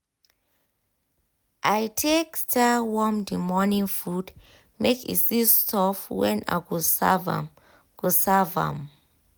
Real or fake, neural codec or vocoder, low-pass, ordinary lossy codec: real; none; none; none